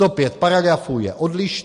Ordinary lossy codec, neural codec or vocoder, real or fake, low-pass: MP3, 48 kbps; none; real; 10.8 kHz